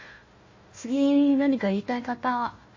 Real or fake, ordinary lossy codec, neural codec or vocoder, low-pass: fake; MP3, 32 kbps; codec, 16 kHz, 1 kbps, FunCodec, trained on Chinese and English, 50 frames a second; 7.2 kHz